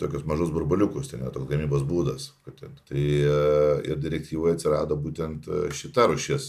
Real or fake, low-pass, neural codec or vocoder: real; 14.4 kHz; none